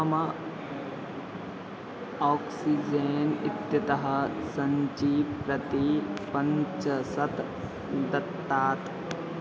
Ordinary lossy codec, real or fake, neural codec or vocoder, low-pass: none; real; none; none